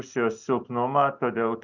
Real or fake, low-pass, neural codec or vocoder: real; 7.2 kHz; none